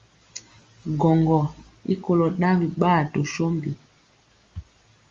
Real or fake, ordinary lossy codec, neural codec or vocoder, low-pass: real; Opus, 32 kbps; none; 7.2 kHz